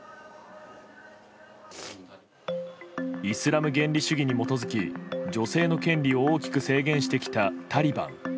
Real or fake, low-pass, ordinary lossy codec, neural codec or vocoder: real; none; none; none